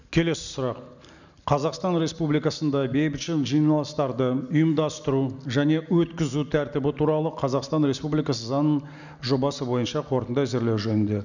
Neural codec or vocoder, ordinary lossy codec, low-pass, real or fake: none; none; 7.2 kHz; real